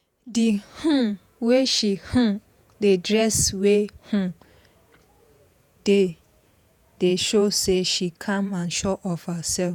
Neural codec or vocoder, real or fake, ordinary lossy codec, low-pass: vocoder, 48 kHz, 128 mel bands, Vocos; fake; none; 19.8 kHz